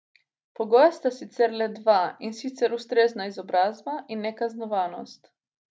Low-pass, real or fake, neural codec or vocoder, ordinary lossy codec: none; real; none; none